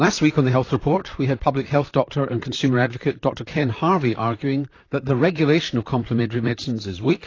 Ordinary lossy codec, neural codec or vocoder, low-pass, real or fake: AAC, 32 kbps; vocoder, 44.1 kHz, 128 mel bands, Pupu-Vocoder; 7.2 kHz; fake